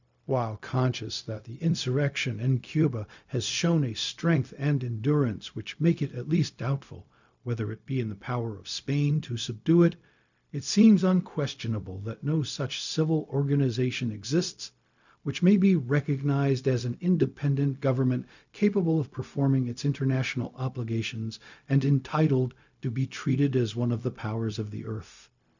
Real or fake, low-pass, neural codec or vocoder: fake; 7.2 kHz; codec, 16 kHz, 0.4 kbps, LongCat-Audio-Codec